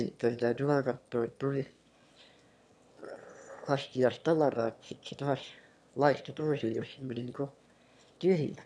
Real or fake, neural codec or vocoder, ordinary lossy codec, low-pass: fake; autoencoder, 22.05 kHz, a latent of 192 numbers a frame, VITS, trained on one speaker; none; none